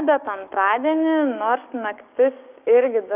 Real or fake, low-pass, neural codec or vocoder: fake; 3.6 kHz; codec, 16 kHz, 6 kbps, DAC